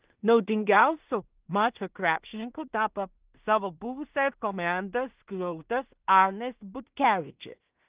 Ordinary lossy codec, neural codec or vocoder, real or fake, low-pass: Opus, 24 kbps; codec, 16 kHz in and 24 kHz out, 0.4 kbps, LongCat-Audio-Codec, two codebook decoder; fake; 3.6 kHz